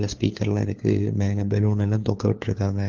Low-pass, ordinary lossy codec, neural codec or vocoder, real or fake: 7.2 kHz; Opus, 16 kbps; codec, 16 kHz, 4 kbps, FunCodec, trained on LibriTTS, 50 frames a second; fake